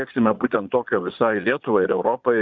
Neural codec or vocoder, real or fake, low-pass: vocoder, 22.05 kHz, 80 mel bands, Vocos; fake; 7.2 kHz